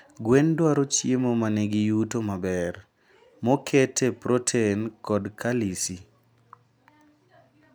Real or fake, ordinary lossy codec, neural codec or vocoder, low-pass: real; none; none; none